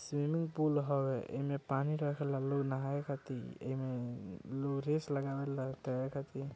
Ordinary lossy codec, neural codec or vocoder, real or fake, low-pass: none; none; real; none